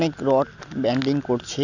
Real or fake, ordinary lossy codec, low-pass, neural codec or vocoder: real; none; 7.2 kHz; none